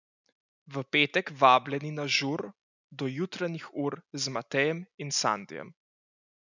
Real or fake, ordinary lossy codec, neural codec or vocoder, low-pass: real; none; none; 7.2 kHz